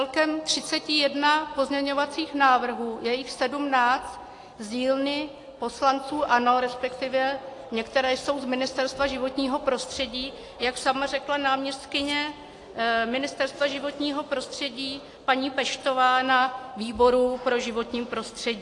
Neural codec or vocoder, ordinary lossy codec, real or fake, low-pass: none; AAC, 48 kbps; real; 10.8 kHz